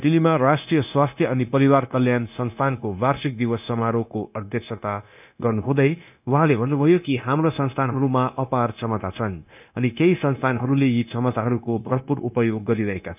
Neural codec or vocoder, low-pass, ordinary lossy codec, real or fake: codec, 16 kHz, 0.9 kbps, LongCat-Audio-Codec; 3.6 kHz; none; fake